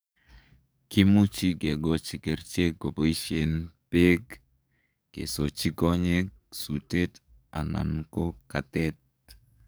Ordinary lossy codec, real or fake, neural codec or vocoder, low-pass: none; fake; codec, 44.1 kHz, 7.8 kbps, DAC; none